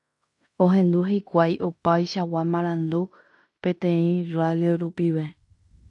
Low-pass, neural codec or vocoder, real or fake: 10.8 kHz; codec, 16 kHz in and 24 kHz out, 0.9 kbps, LongCat-Audio-Codec, fine tuned four codebook decoder; fake